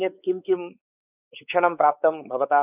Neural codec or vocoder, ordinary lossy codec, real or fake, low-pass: codec, 16 kHz, 4 kbps, X-Codec, WavLM features, trained on Multilingual LibriSpeech; none; fake; 3.6 kHz